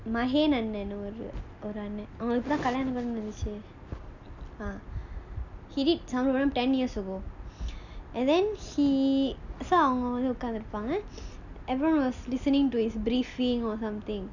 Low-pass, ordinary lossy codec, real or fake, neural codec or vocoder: 7.2 kHz; none; real; none